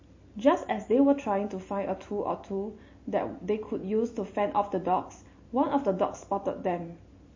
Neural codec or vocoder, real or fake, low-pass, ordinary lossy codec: none; real; 7.2 kHz; MP3, 32 kbps